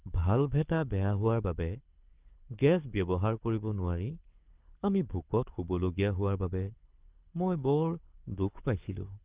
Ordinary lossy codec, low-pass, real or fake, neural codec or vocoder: Opus, 24 kbps; 3.6 kHz; fake; codec, 24 kHz, 6 kbps, HILCodec